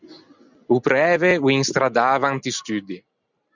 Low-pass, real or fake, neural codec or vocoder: 7.2 kHz; real; none